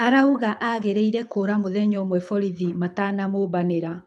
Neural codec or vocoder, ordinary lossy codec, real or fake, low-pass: codec, 24 kHz, 6 kbps, HILCodec; none; fake; none